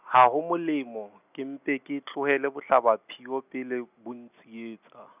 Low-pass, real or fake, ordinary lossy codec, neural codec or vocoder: 3.6 kHz; real; none; none